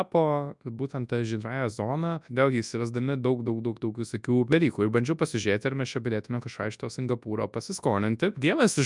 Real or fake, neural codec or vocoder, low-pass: fake; codec, 24 kHz, 0.9 kbps, WavTokenizer, large speech release; 10.8 kHz